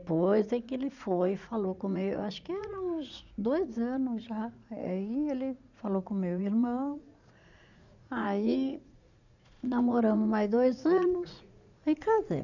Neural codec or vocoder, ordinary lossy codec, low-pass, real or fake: vocoder, 44.1 kHz, 80 mel bands, Vocos; none; 7.2 kHz; fake